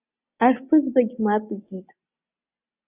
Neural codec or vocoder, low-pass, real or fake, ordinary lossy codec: none; 3.6 kHz; real; AAC, 16 kbps